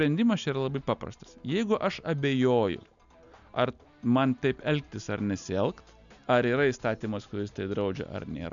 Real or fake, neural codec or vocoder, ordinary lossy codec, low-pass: real; none; MP3, 96 kbps; 7.2 kHz